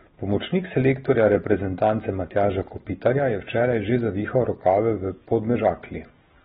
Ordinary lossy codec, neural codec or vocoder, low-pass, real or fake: AAC, 16 kbps; none; 14.4 kHz; real